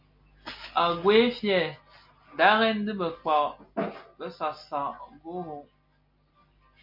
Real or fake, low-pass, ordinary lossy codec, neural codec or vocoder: real; 5.4 kHz; MP3, 48 kbps; none